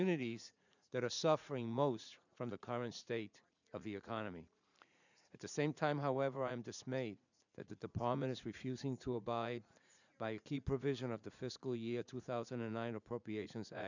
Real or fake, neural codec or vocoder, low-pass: fake; vocoder, 44.1 kHz, 80 mel bands, Vocos; 7.2 kHz